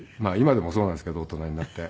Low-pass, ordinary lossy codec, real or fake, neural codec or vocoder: none; none; real; none